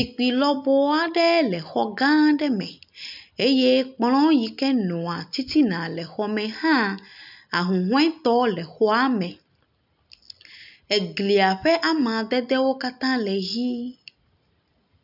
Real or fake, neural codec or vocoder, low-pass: real; none; 5.4 kHz